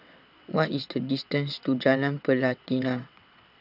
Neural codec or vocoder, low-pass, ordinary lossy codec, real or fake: vocoder, 22.05 kHz, 80 mel bands, WaveNeXt; 5.4 kHz; none; fake